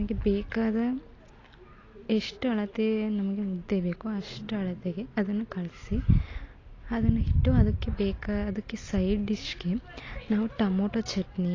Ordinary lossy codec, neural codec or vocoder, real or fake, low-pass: AAC, 32 kbps; none; real; 7.2 kHz